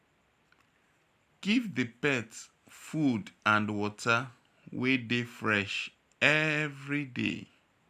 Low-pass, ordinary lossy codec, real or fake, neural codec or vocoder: 14.4 kHz; none; real; none